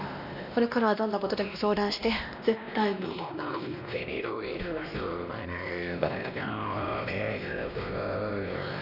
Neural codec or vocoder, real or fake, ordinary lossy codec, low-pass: codec, 16 kHz, 1 kbps, X-Codec, WavLM features, trained on Multilingual LibriSpeech; fake; none; 5.4 kHz